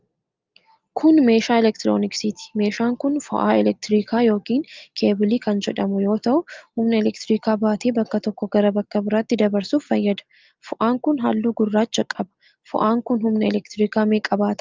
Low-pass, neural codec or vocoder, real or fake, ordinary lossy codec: 7.2 kHz; none; real; Opus, 32 kbps